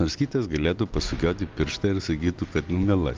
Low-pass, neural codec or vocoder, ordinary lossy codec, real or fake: 7.2 kHz; none; Opus, 32 kbps; real